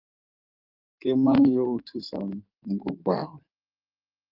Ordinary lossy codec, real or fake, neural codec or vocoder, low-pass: Opus, 32 kbps; fake; codec, 16 kHz in and 24 kHz out, 2.2 kbps, FireRedTTS-2 codec; 5.4 kHz